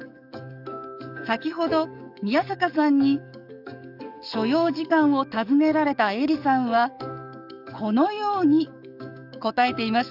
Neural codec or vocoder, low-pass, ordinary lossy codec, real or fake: codec, 44.1 kHz, 7.8 kbps, DAC; 5.4 kHz; none; fake